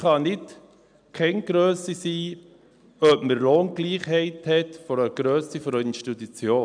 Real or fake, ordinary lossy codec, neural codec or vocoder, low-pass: real; none; none; 9.9 kHz